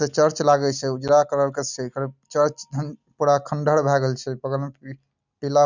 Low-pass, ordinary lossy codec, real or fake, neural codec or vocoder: 7.2 kHz; none; real; none